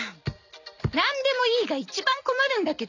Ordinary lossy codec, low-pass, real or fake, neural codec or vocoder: none; 7.2 kHz; real; none